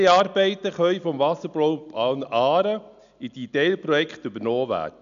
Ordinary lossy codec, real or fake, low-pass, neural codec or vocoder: none; real; 7.2 kHz; none